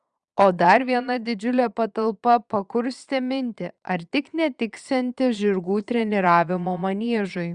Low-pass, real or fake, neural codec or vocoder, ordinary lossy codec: 9.9 kHz; fake; vocoder, 22.05 kHz, 80 mel bands, Vocos; Opus, 64 kbps